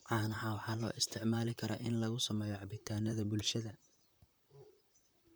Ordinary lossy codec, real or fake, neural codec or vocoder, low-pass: none; fake; vocoder, 44.1 kHz, 128 mel bands, Pupu-Vocoder; none